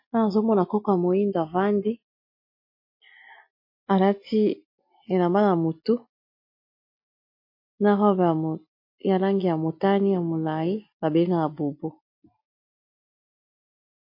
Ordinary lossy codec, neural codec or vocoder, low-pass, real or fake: MP3, 32 kbps; none; 5.4 kHz; real